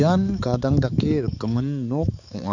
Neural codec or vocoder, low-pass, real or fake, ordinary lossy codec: codec, 16 kHz, 4 kbps, X-Codec, HuBERT features, trained on balanced general audio; 7.2 kHz; fake; none